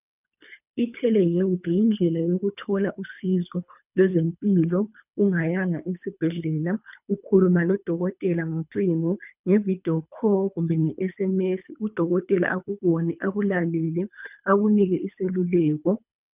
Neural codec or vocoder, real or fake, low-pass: codec, 24 kHz, 3 kbps, HILCodec; fake; 3.6 kHz